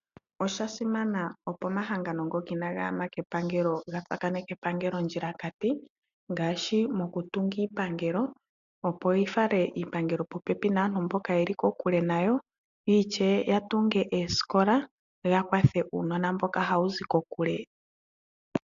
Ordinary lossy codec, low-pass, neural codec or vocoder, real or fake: AAC, 96 kbps; 7.2 kHz; none; real